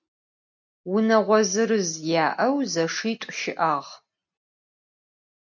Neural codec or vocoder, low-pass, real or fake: none; 7.2 kHz; real